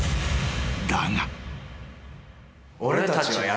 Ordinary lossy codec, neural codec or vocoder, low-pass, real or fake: none; none; none; real